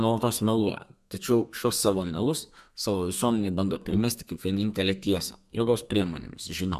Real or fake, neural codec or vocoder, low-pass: fake; codec, 32 kHz, 1.9 kbps, SNAC; 14.4 kHz